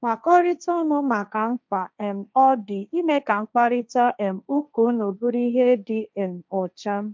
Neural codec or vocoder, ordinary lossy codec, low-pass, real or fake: codec, 16 kHz, 1.1 kbps, Voila-Tokenizer; none; none; fake